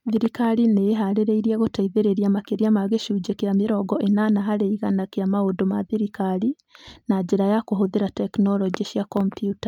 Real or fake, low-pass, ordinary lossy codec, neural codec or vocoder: real; 19.8 kHz; none; none